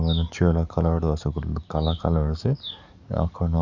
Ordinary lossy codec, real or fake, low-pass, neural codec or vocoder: none; fake; 7.2 kHz; codec, 44.1 kHz, 7.8 kbps, DAC